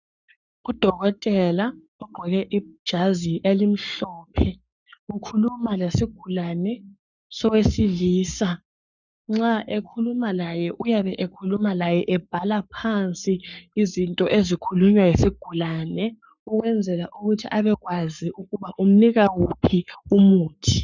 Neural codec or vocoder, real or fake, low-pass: codec, 44.1 kHz, 7.8 kbps, Pupu-Codec; fake; 7.2 kHz